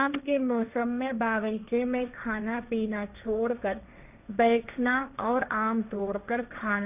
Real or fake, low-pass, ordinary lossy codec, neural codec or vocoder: fake; 3.6 kHz; none; codec, 16 kHz, 1.1 kbps, Voila-Tokenizer